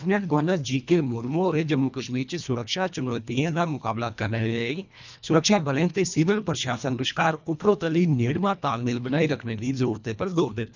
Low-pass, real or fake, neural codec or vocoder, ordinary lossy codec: 7.2 kHz; fake; codec, 24 kHz, 1.5 kbps, HILCodec; none